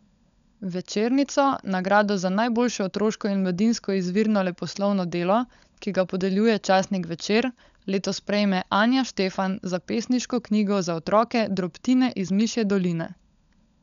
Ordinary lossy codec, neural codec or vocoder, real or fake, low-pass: none; codec, 16 kHz, 16 kbps, FunCodec, trained on LibriTTS, 50 frames a second; fake; 7.2 kHz